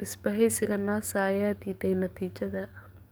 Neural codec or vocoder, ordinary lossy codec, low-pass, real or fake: codec, 44.1 kHz, 7.8 kbps, DAC; none; none; fake